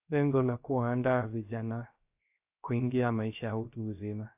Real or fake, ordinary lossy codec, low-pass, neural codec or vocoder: fake; AAC, 32 kbps; 3.6 kHz; codec, 16 kHz, 0.3 kbps, FocalCodec